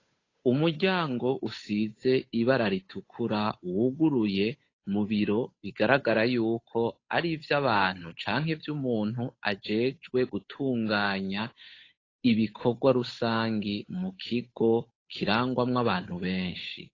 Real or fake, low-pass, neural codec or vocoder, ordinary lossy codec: fake; 7.2 kHz; codec, 16 kHz, 8 kbps, FunCodec, trained on Chinese and English, 25 frames a second; AAC, 32 kbps